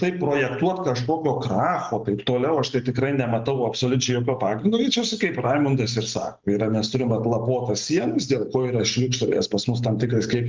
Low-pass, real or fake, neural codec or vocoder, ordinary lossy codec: 7.2 kHz; real; none; Opus, 24 kbps